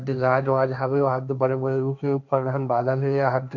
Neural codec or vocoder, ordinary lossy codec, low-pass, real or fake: codec, 16 kHz, 1.1 kbps, Voila-Tokenizer; none; 7.2 kHz; fake